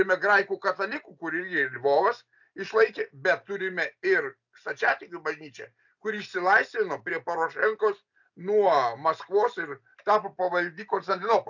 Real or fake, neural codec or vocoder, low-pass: real; none; 7.2 kHz